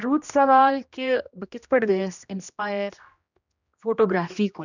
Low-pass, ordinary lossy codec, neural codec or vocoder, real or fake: 7.2 kHz; none; codec, 16 kHz, 1 kbps, X-Codec, HuBERT features, trained on general audio; fake